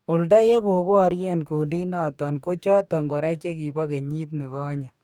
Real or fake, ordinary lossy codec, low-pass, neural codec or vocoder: fake; none; 19.8 kHz; codec, 44.1 kHz, 2.6 kbps, DAC